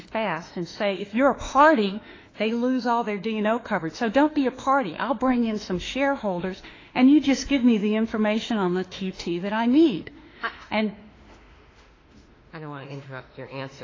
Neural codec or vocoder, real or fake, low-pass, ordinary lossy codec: autoencoder, 48 kHz, 32 numbers a frame, DAC-VAE, trained on Japanese speech; fake; 7.2 kHz; AAC, 32 kbps